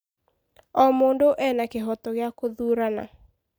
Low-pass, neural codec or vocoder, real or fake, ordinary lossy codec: none; none; real; none